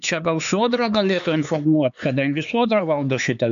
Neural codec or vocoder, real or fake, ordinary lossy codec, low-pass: codec, 16 kHz, 4 kbps, X-Codec, HuBERT features, trained on LibriSpeech; fake; AAC, 96 kbps; 7.2 kHz